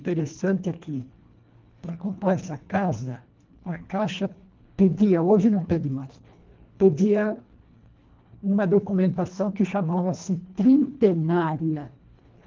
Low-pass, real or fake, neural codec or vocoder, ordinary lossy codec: 7.2 kHz; fake; codec, 24 kHz, 1.5 kbps, HILCodec; Opus, 24 kbps